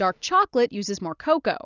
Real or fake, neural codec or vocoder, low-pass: real; none; 7.2 kHz